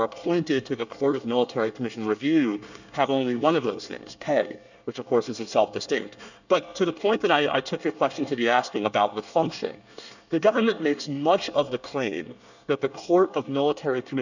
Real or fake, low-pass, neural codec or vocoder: fake; 7.2 kHz; codec, 24 kHz, 1 kbps, SNAC